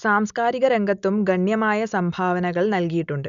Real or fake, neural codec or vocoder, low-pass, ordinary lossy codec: real; none; 7.2 kHz; none